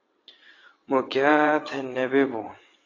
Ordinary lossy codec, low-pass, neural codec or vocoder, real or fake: AAC, 32 kbps; 7.2 kHz; vocoder, 22.05 kHz, 80 mel bands, WaveNeXt; fake